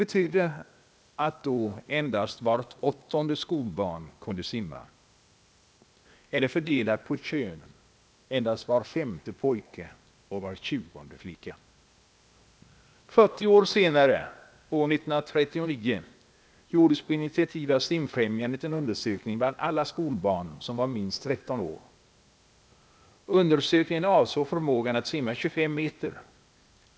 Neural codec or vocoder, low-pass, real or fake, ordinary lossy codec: codec, 16 kHz, 0.8 kbps, ZipCodec; none; fake; none